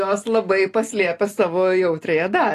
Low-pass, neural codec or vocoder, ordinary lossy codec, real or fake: 14.4 kHz; none; AAC, 48 kbps; real